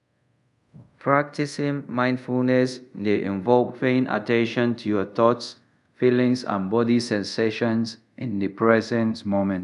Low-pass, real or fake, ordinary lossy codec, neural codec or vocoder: 10.8 kHz; fake; none; codec, 24 kHz, 0.5 kbps, DualCodec